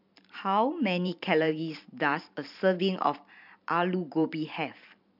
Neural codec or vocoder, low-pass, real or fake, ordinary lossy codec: vocoder, 44.1 kHz, 128 mel bands every 512 samples, BigVGAN v2; 5.4 kHz; fake; MP3, 48 kbps